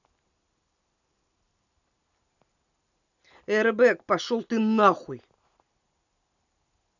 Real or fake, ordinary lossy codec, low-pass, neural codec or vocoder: real; none; 7.2 kHz; none